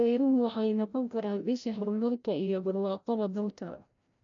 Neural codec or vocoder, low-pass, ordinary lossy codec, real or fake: codec, 16 kHz, 0.5 kbps, FreqCodec, larger model; 7.2 kHz; none; fake